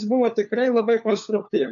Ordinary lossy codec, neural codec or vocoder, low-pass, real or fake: AAC, 64 kbps; codec, 16 kHz, 4 kbps, FunCodec, trained on LibriTTS, 50 frames a second; 7.2 kHz; fake